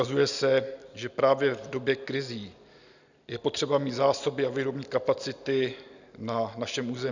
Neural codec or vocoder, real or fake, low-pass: none; real; 7.2 kHz